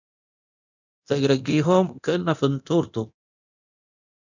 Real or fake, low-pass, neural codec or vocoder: fake; 7.2 kHz; codec, 24 kHz, 0.9 kbps, DualCodec